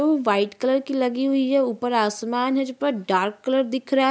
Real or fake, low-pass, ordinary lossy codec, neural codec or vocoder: real; none; none; none